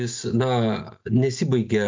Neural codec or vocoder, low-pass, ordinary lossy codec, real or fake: none; 7.2 kHz; MP3, 64 kbps; real